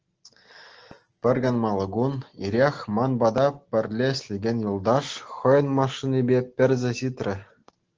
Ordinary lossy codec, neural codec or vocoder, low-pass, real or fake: Opus, 16 kbps; none; 7.2 kHz; real